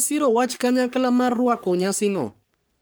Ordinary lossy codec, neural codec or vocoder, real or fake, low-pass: none; codec, 44.1 kHz, 3.4 kbps, Pupu-Codec; fake; none